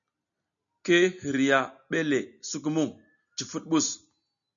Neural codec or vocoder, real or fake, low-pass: none; real; 7.2 kHz